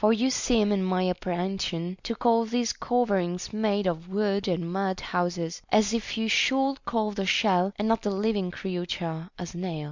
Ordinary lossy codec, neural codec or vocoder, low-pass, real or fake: Opus, 64 kbps; none; 7.2 kHz; real